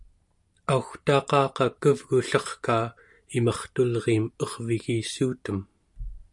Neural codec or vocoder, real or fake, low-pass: none; real; 10.8 kHz